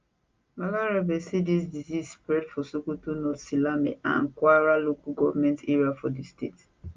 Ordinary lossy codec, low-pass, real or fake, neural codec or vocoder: Opus, 24 kbps; 7.2 kHz; real; none